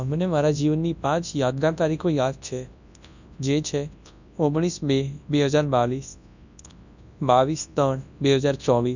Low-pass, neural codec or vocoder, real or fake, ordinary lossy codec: 7.2 kHz; codec, 24 kHz, 0.9 kbps, WavTokenizer, large speech release; fake; none